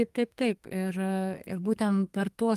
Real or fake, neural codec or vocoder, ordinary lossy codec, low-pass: fake; codec, 32 kHz, 1.9 kbps, SNAC; Opus, 32 kbps; 14.4 kHz